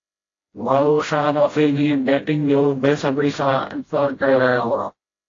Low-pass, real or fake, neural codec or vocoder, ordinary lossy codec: 7.2 kHz; fake; codec, 16 kHz, 0.5 kbps, FreqCodec, smaller model; AAC, 32 kbps